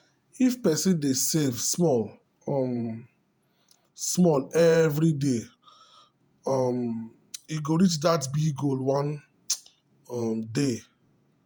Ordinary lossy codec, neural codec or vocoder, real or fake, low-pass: none; vocoder, 48 kHz, 128 mel bands, Vocos; fake; none